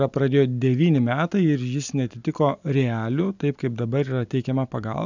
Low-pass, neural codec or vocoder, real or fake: 7.2 kHz; none; real